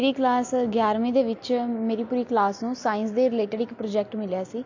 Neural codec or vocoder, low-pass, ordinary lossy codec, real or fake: none; 7.2 kHz; AAC, 48 kbps; real